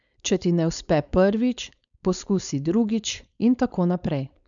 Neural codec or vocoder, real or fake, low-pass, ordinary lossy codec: codec, 16 kHz, 4.8 kbps, FACodec; fake; 7.2 kHz; none